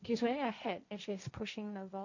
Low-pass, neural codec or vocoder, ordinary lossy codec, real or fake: 7.2 kHz; codec, 16 kHz, 1.1 kbps, Voila-Tokenizer; none; fake